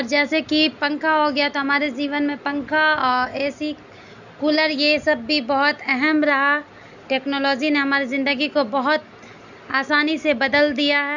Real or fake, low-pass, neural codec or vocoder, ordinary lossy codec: real; 7.2 kHz; none; none